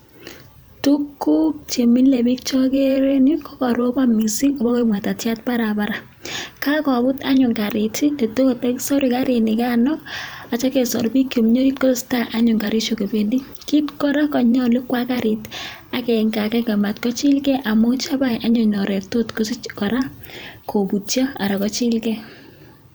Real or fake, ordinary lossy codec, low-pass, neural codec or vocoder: fake; none; none; vocoder, 44.1 kHz, 128 mel bands every 512 samples, BigVGAN v2